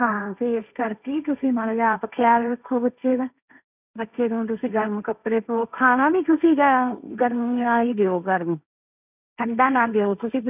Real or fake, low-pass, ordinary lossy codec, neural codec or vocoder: fake; 3.6 kHz; none; codec, 16 kHz, 1.1 kbps, Voila-Tokenizer